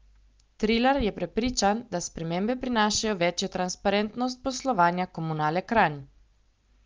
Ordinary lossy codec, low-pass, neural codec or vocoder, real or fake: Opus, 24 kbps; 7.2 kHz; none; real